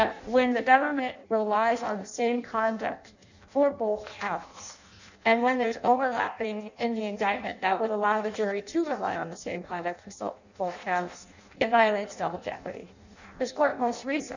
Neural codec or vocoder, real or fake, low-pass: codec, 16 kHz in and 24 kHz out, 0.6 kbps, FireRedTTS-2 codec; fake; 7.2 kHz